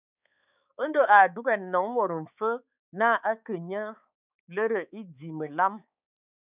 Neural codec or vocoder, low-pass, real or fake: codec, 16 kHz, 4 kbps, X-Codec, HuBERT features, trained on balanced general audio; 3.6 kHz; fake